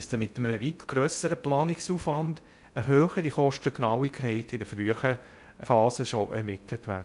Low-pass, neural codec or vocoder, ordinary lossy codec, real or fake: 10.8 kHz; codec, 16 kHz in and 24 kHz out, 0.6 kbps, FocalCodec, streaming, 2048 codes; none; fake